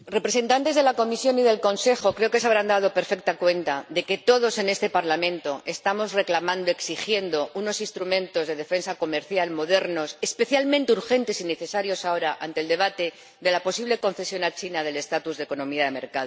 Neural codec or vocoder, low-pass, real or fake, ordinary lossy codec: none; none; real; none